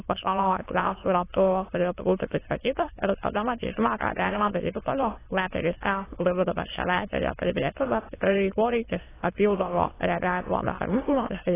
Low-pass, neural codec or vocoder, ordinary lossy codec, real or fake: 3.6 kHz; autoencoder, 22.05 kHz, a latent of 192 numbers a frame, VITS, trained on many speakers; AAC, 16 kbps; fake